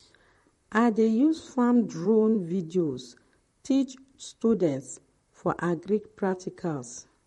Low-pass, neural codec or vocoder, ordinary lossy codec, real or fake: 19.8 kHz; vocoder, 44.1 kHz, 128 mel bands, Pupu-Vocoder; MP3, 48 kbps; fake